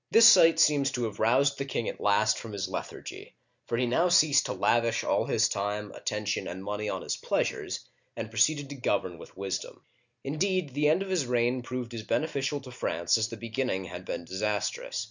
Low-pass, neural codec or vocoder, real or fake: 7.2 kHz; none; real